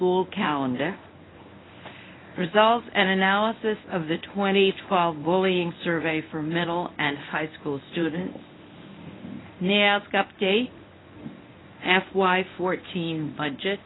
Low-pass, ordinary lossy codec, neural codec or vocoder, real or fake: 7.2 kHz; AAC, 16 kbps; codec, 24 kHz, 0.9 kbps, WavTokenizer, medium speech release version 1; fake